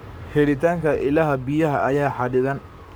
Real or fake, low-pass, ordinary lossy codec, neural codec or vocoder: fake; none; none; codec, 44.1 kHz, 7.8 kbps, Pupu-Codec